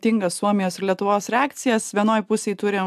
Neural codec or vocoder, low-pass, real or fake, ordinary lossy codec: none; 14.4 kHz; real; AAC, 96 kbps